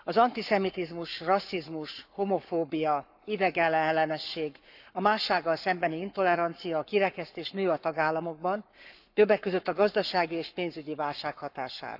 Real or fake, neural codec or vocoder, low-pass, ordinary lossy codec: fake; codec, 44.1 kHz, 7.8 kbps, Pupu-Codec; 5.4 kHz; none